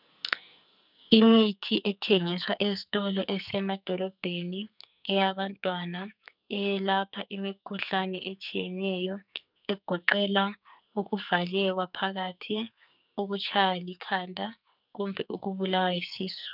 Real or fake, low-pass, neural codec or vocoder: fake; 5.4 kHz; codec, 44.1 kHz, 2.6 kbps, SNAC